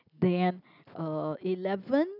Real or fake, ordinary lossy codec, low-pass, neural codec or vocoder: real; none; 5.4 kHz; none